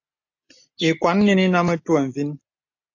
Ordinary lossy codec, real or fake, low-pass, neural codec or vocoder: AAC, 48 kbps; real; 7.2 kHz; none